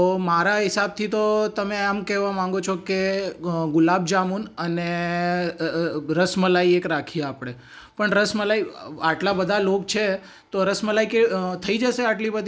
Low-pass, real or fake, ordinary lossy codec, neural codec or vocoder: none; real; none; none